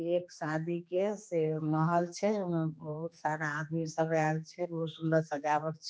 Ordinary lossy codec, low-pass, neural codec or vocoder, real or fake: none; none; codec, 16 kHz, 2 kbps, X-Codec, HuBERT features, trained on general audio; fake